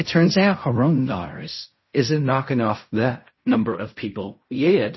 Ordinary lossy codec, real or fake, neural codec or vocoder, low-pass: MP3, 24 kbps; fake; codec, 16 kHz in and 24 kHz out, 0.4 kbps, LongCat-Audio-Codec, fine tuned four codebook decoder; 7.2 kHz